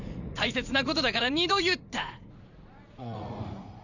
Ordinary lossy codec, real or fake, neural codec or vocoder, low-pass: none; fake; vocoder, 44.1 kHz, 80 mel bands, Vocos; 7.2 kHz